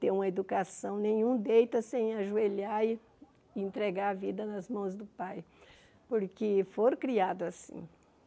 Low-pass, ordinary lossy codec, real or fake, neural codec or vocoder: none; none; real; none